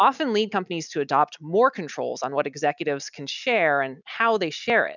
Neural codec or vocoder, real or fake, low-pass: none; real; 7.2 kHz